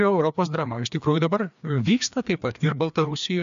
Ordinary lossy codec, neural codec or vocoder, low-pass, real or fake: MP3, 64 kbps; codec, 16 kHz, 2 kbps, FreqCodec, larger model; 7.2 kHz; fake